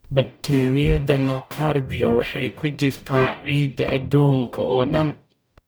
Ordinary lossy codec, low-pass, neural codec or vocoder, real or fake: none; none; codec, 44.1 kHz, 0.9 kbps, DAC; fake